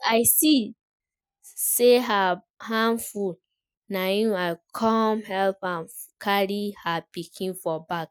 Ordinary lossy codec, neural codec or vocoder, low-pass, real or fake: none; none; none; real